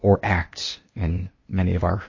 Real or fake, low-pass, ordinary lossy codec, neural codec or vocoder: fake; 7.2 kHz; MP3, 32 kbps; codec, 16 kHz, 0.8 kbps, ZipCodec